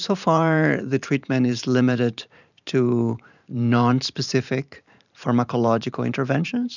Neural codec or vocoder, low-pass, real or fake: vocoder, 44.1 kHz, 128 mel bands every 512 samples, BigVGAN v2; 7.2 kHz; fake